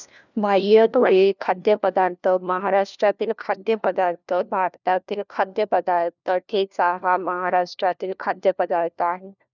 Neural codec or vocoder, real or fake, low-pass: codec, 16 kHz, 1 kbps, FunCodec, trained on LibriTTS, 50 frames a second; fake; 7.2 kHz